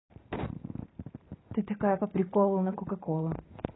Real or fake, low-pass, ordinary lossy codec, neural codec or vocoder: fake; 7.2 kHz; AAC, 16 kbps; vocoder, 44.1 kHz, 128 mel bands every 512 samples, BigVGAN v2